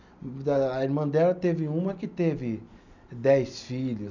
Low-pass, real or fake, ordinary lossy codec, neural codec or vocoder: 7.2 kHz; real; none; none